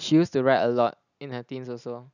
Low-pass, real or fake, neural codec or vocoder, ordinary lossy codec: 7.2 kHz; real; none; none